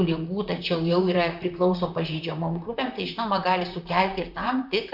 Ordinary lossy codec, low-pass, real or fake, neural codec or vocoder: AAC, 48 kbps; 5.4 kHz; fake; vocoder, 44.1 kHz, 128 mel bands, Pupu-Vocoder